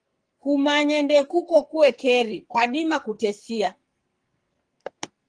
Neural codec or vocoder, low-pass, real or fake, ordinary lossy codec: codec, 44.1 kHz, 2.6 kbps, SNAC; 9.9 kHz; fake; Opus, 24 kbps